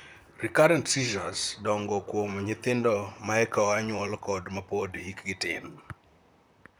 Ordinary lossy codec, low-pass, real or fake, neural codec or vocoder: none; none; fake; vocoder, 44.1 kHz, 128 mel bands, Pupu-Vocoder